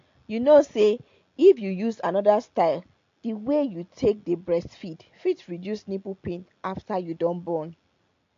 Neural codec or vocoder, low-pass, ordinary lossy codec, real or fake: none; 7.2 kHz; AAC, 48 kbps; real